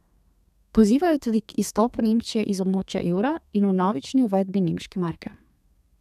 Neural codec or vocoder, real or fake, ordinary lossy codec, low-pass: codec, 32 kHz, 1.9 kbps, SNAC; fake; none; 14.4 kHz